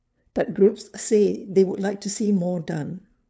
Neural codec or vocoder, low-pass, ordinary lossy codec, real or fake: codec, 16 kHz, 2 kbps, FunCodec, trained on LibriTTS, 25 frames a second; none; none; fake